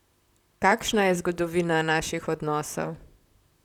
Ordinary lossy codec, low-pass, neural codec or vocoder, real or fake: none; 19.8 kHz; vocoder, 44.1 kHz, 128 mel bands, Pupu-Vocoder; fake